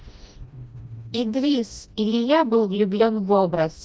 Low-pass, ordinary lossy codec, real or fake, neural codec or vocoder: none; none; fake; codec, 16 kHz, 1 kbps, FreqCodec, smaller model